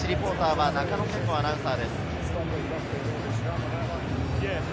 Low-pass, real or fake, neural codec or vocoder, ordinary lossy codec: none; real; none; none